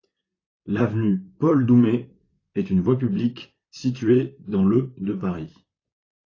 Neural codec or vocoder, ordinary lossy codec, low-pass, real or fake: vocoder, 44.1 kHz, 128 mel bands, Pupu-Vocoder; AAC, 32 kbps; 7.2 kHz; fake